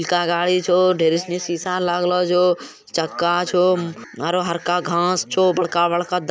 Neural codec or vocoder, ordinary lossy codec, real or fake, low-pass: none; none; real; none